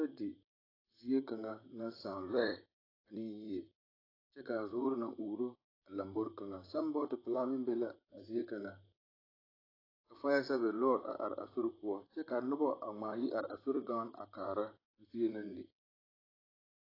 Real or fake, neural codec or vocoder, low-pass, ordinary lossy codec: fake; vocoder, 44.1 kHz, 128 mel bands, Pupu-Vocoder; 5.4 kHz; AAC, 24 kbps